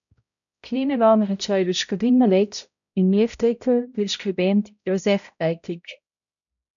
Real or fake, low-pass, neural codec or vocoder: fake; 7.2 kHz; codec, 16 kHz, 0.5 kbps, X-Codec, HuBERT features, trained on balanced general audio